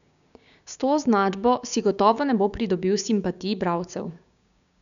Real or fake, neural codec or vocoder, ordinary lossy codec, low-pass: fake; codec, 16 kHz, 6 kbps, DAC; none; 7.2 kHz